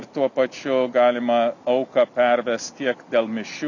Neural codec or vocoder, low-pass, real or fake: none; 7.2 kHz; real